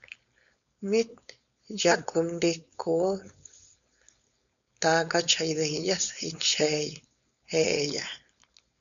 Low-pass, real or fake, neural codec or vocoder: 7.2 kHz; fake; codec, 16 kHz, 4.8 kbps, FACodec